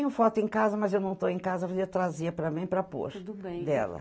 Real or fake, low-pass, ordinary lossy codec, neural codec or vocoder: real; none; none; none